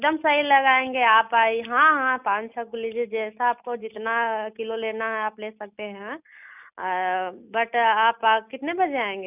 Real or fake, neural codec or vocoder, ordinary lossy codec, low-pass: real; none; none; 3.6 kHz